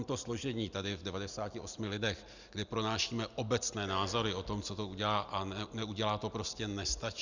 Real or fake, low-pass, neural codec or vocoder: real; 7.2 kHz; none